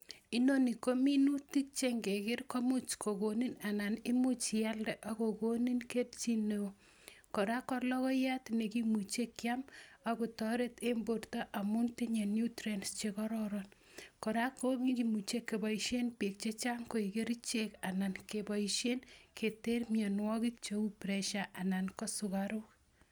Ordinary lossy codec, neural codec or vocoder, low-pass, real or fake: none; none; none; real